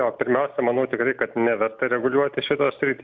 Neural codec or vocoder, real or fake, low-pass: none; real; 7.2 kHz